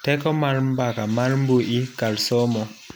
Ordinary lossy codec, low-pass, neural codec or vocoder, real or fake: none; none; none; real